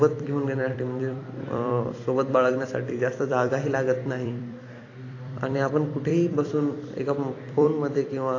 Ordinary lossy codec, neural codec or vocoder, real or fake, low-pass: AAC, 32 kbps; vocoder, 44.1 kHz, 128 mel bands every 256 samples, BigVGAN v2; fake; 7.2 kHz